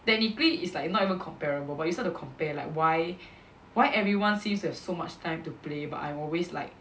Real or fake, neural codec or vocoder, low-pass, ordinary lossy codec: real; none; none; none